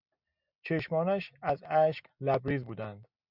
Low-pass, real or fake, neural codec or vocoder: 5.4 kHz; real; none